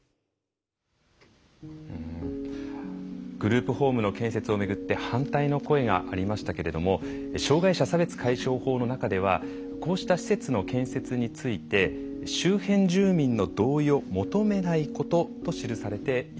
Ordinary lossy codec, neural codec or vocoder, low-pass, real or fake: none; none; none; real